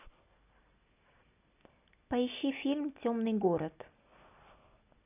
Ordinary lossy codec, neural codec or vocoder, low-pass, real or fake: none; none; 3.6 kHz; real